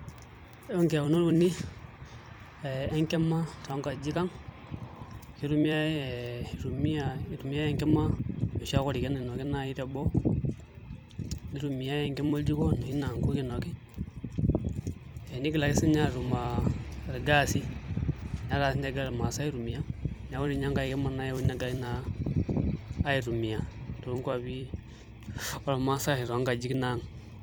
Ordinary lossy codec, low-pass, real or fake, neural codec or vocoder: none; none; fake; vocoder, 44.1 kHz, 128 mel bands every 256 samples, BigVGAN v2